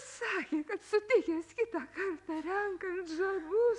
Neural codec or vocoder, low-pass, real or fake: none; 10.8 kHz; real